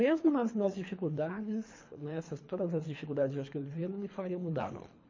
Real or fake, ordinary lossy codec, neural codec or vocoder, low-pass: fake; MP3, 32 kbps; codec, 24 kHz, 3 kbps, HILCodec; 7.2 kHz